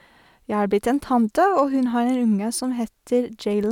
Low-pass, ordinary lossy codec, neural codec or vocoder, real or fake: 19.8 kHz; none; none; real